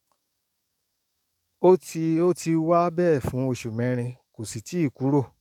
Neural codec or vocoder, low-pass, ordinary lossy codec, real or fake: codec, 44.1 kHz, 7.8 kbps, DAC; 19.8 kHz; none; fake